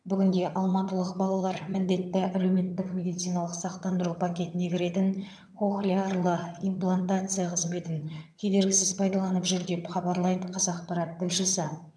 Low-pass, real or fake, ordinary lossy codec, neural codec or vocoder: none; fake; none; vocoder, 22.05 kHz, 80 mel bands, HiFi-GAN